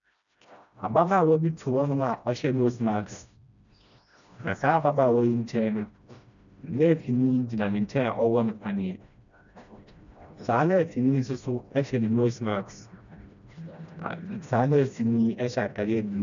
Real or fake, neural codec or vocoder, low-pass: fake; codec, 16 kHz, 1 kbps, FreqCodec, smaller model; 7.2 kHz